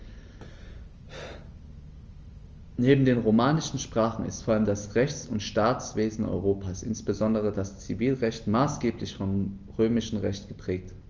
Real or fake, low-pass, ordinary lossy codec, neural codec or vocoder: real; 7.2 kHz; Opus, 24 kbps; none